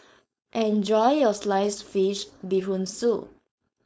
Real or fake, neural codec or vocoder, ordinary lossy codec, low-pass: fake; codec, 16 kHz, 4.8 kbps, FACodec; none; none